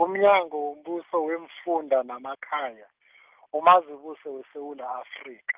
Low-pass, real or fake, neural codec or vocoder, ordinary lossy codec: 3.6 kHz; real; none; Opus, 32 kbps